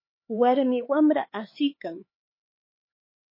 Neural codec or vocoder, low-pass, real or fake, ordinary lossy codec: codec, 16 kHz, 4 kbps, X-Codec, HuBERT features, trained on LibriSpeech; 5.4 kHz; fake; MP3, 24 kbps